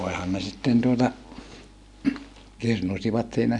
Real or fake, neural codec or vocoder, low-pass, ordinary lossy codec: real; none; 10.8 kHz; none